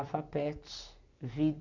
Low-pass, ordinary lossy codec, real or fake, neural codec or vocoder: 7.2 kHz; none; real; none